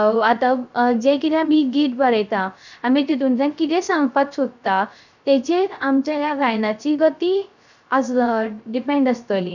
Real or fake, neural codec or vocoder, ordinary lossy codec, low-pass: fake; codec, 16 kHz, 0.3 kbps, FocalCodec; none; 7.2 kHz